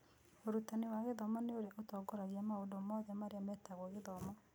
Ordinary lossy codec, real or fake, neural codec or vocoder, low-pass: none; real; none; none